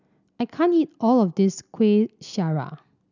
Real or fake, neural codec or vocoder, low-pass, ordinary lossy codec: real; none; 7.2 kHz; none